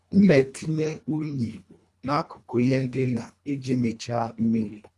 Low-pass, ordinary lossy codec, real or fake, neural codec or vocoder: none; none; fake; codec, 24 kHz, 1.5 kbps, HILCodec